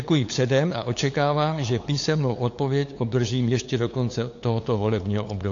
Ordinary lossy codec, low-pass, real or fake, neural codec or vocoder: AAC, 48 kbps; 7.2 kHz; fake; codec, 16 kHz, 8 kbps, FunCodec, trained on LibriTTS, 25 frames a second